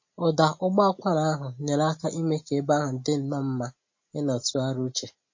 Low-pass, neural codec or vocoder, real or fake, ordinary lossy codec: 7.2 kHz; none; real; MP3, 32 kbps